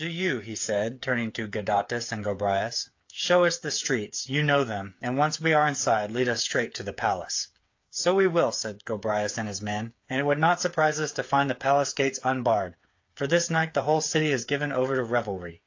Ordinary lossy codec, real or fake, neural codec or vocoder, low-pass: AAC, 48 kbps; fake; codec, 16 kHz, 8 kbps, FreqCodec, smaller model; 7.2 kHz